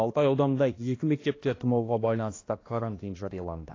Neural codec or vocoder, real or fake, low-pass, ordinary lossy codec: codec, 16 kHz, 1 kbps, X-Codec, HuBERT features, trained on balanced general audio; fake; 7.2 kHz; AAC, 32 kbps